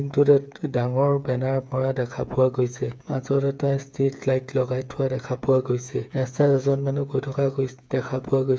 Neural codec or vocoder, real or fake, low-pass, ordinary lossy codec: codec, 16 kHz, 8 kbps, FreqCodec, smaller model; fake; none; none